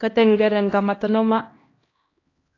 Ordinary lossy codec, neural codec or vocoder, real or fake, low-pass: AAC, 32 kbps; codec, 16 kHz, 1 kbps, X-Codec, HuBERT features, trained on LibriSpeech; fake; 7.2 kHz